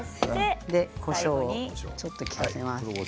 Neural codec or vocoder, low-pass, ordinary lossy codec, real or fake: none; none; none; real